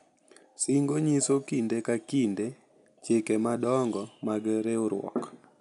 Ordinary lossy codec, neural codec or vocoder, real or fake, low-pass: none; none; real; 10.8 kHz